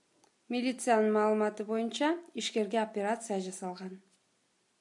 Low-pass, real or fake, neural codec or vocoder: 10.8 kHz; real; none